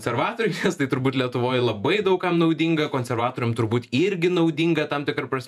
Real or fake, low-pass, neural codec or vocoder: fake; 14.4 kHz; vocoder, 48 kHz, 128 mel bands, Vocos